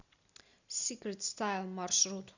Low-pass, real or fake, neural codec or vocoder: 7.2 kHz; real; none